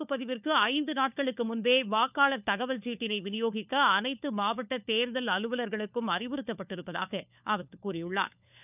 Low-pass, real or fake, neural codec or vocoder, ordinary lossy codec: 3.6 kHz; fake; codec, 16 kHz, 4 kbps, FunCodec, trained on LibriTTS, 50 frames a second; none